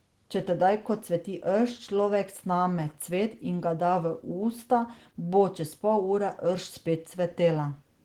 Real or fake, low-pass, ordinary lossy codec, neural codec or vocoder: real; 19.8 kHz; Opus, 16 kbps; none